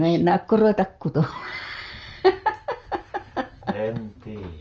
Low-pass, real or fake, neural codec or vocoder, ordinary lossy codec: 7.2 kHz; real; none; Opus, 24 kbps